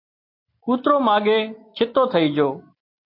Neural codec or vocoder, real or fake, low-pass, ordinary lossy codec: none; real; 5.4 kHz; MP3, 32 kbps